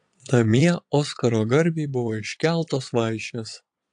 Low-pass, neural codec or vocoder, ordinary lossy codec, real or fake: 9.9 kHz; vocoder, 22.05 kHz, 80 mel bands, Vocos; AAC, 64 kbps; fake